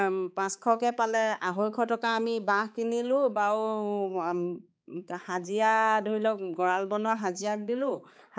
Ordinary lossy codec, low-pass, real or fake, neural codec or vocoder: none; none; fake; codec, 16 kHz, 4 kbps, X-Codec, HuBERT features, trained on balanced general audio